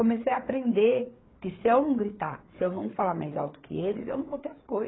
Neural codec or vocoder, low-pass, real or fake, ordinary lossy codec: codec, 16 kHz, 4 kbps, FreqCodec, larger model; 7.2 kHz; fake; AAC, 16 kbps